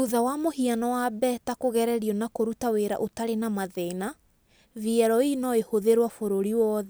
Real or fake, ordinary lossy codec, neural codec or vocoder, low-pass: real; none; none; none